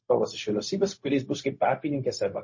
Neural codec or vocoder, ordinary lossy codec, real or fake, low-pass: codec, 16 kHz, 0.4 kbps, LongCat-Audio-Codec; MP3, 32 kbps; fake; 7.2 kHz